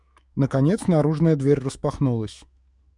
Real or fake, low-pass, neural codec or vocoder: fake; 10.8 kHz; autoencoder, 48 kHz, 128 numbers a frame, DAC-VAE, trained on Japanese speech